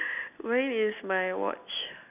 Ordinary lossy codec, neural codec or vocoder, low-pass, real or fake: none; none; 3.6 kHz; real